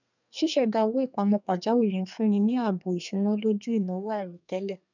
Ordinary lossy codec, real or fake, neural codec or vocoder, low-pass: none; fake; codec, 32 kHz, 1.9 kbps, SNAC; 7.2 kHz